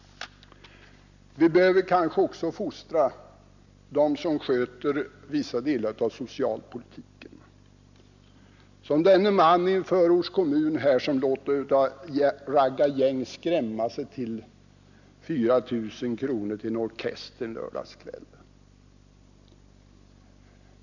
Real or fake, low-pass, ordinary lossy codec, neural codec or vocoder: real; 7.2 kHz; none; none